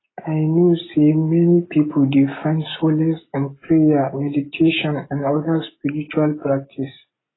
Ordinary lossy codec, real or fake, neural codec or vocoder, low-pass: AAC, 16 kbps; real; none; 7.2 kHz